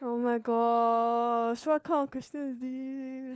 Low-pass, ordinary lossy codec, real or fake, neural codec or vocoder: none; none; fake; codec, 16 kHz, 4 kbps, FunCodec, trained on LibriTTS, 50 frames a second